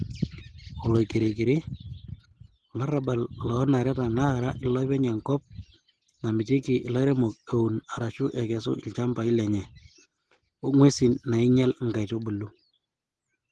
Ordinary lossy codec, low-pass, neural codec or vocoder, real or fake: Opus, 16 kbps; 10.8 kHz; none; real